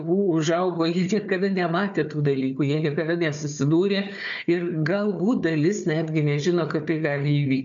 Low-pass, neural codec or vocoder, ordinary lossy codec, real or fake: 7.2 kHz; codec, 16 kHz, 4 kbps, FunCodec, trained on Chinese and English, 50 frames a second; MP3, 96 kbps; fake